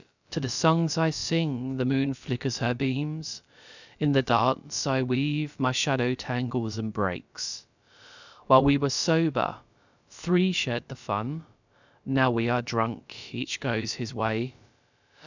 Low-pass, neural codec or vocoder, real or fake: 7.2 kHz; codec, 16 kHz, about 1 kbps, DyCAST, with the encoder's durations; fake